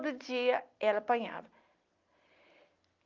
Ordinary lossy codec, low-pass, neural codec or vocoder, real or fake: Opus, 32 kbps; 7.2 kHz; none; real